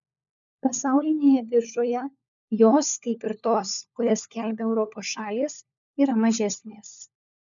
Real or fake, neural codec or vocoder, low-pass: fake; codec, 16 kHz, 4 kbps, FunCodec, trained on LibriTTS, 50 frames a second; 7.2 kHz